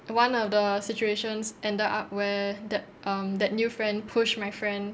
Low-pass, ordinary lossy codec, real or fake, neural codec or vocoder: none; none; real; none